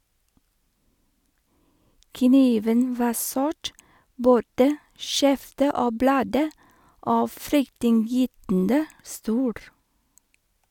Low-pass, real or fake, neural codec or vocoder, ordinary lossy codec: 19.8 kHz; real; none; none